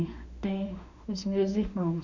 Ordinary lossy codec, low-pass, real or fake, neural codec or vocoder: none; 7.2 kHz; fake; autoencoder, 48 kHz, 32 numbers a frame, DAC-VAE, trained on Japanese speech